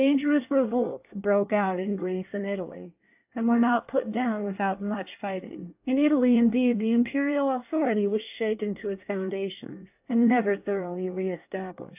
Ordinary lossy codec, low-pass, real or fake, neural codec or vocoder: AAC, 32 kbps; 3.6 kHz; fake; codec, 24 kHz, 1 kbps, SNAC